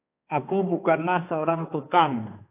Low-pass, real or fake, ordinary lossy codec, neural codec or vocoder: 3.6 kHz; fake; AAC, 32 kbps; codec, 16 kHz, 2 kbps, X-Codec, HuBERT features, trained on balanced general audio